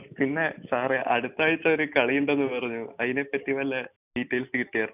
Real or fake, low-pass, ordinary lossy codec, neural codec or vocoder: real; 3.6 kHz; none; none